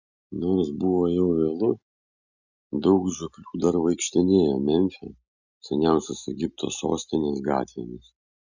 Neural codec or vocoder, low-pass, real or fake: none; 7.2 kHz; real